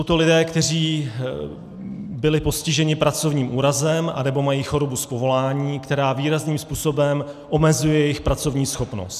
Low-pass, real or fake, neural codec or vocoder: 14.4 kHz; real; none